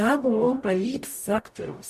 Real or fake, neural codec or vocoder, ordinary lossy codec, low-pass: fake; codec, 44.1 kHz, 0.9 kbps, DAC; MP3, 64 kbps; 14.4 kHz